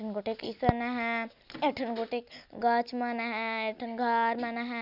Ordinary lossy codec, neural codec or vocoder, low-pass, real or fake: none; none; 5.4 kHz; real